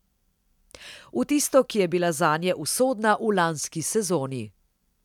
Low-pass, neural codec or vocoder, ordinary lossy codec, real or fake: 19.8 kHz; none; none; real